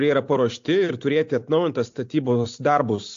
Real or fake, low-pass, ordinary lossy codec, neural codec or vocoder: real; 7.2 kHz; AAC, 48 kbps; none